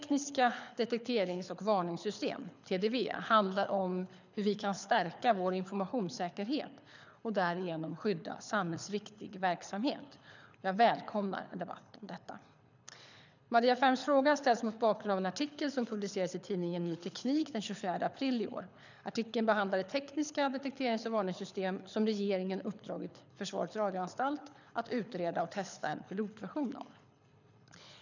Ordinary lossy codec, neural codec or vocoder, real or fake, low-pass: none; codec, 24 kHz, 6 kbps, HILCodec; fake; 7.2 kHz